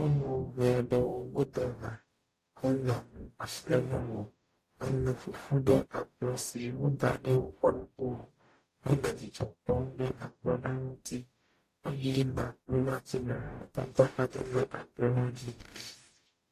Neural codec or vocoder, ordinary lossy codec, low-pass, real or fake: codec, 44.1 kHz, 0.9 kbps, DAC; AAC, 48 kbps; 14.4 kHz; fake